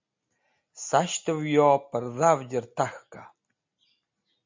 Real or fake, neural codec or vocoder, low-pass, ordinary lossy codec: real; none; 7.2 kHz; MP3, 64 kbps